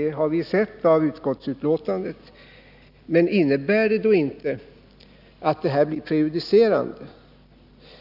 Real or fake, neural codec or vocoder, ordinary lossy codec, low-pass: real; none; none; 5.4 kHz